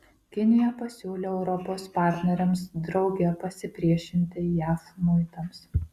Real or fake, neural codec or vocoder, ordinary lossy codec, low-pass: real; none; Opus, 64 kbps; 14.4 kHz